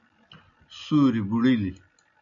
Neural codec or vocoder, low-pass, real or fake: none; 7.2 kHz; real